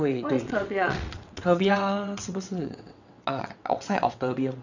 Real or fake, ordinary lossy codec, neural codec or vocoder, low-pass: fake; none; vocoder, 22.05 kHz, 80 mel bands, WaveNeXt; 7.2 kHz